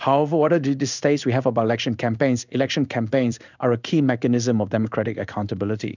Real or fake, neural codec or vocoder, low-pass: fake; codec, 16 kHz in and 24 kHz out, 1 kbps, XY-Tokenizer; 7.2 kHz